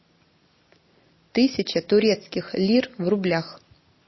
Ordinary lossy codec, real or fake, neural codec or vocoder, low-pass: MP3, 24 kbps; real; none; 7.2 kHz